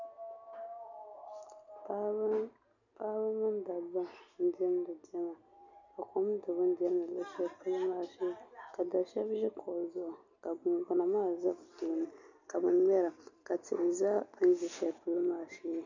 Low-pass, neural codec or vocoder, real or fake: 7.2 kHz; none; real